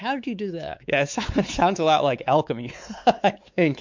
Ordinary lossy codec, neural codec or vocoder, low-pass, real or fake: MP3, 64 kbps; codec, 16 kHz, 4 kbps, X-Codec, WavLM features, trained on Multilingual LibriSpeech; 7.2 kHz; fake